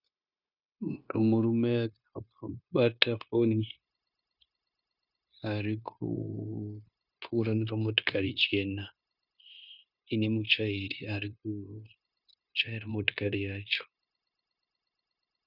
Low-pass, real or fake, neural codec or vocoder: 5.4 kHz; fake; codec, 16 kHz, 0.9 kbps, LongCat-Audio-Codec